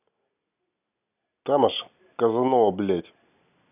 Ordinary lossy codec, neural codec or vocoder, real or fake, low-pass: none; none; real; 3.6 kHz